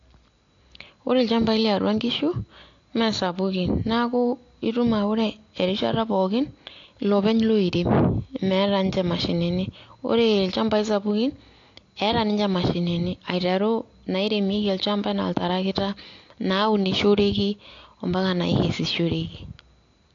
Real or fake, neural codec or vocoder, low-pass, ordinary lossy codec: real; none; 7.2 kHz; AAC, 48 kbps